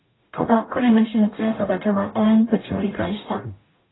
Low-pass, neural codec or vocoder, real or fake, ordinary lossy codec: 7.2 kHz; codec, 44.1 kHz, 0.9 kbps, DAC; fake; AAC, 16 kbps